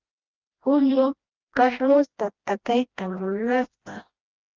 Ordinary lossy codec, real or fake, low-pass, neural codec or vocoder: Opus, 32 kbps; fake; 7.2 kHz; codec, 16 kHz, 1 kbps, FreqCodec, smaller model